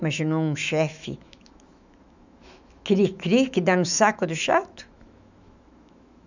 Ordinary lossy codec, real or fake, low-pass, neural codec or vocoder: none; fake; 7.2 kHz; autoencoder, 48 kHz, 128 numbers a frame, DAC-VAE, trained on Japanese speech